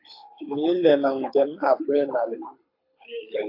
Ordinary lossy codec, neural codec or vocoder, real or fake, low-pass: AAC, 32 kbps; codec, 24 kHz, 6 kbps, HILCodec; fake; 5.4 kHz